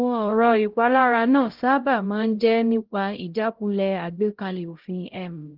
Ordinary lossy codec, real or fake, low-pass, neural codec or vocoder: Opus, 16 kbps; fake; 5.4 kHz; codec, 16 kHz, 0.5 kbps, X-Codec, HuBERT features, trained on LibriSpeech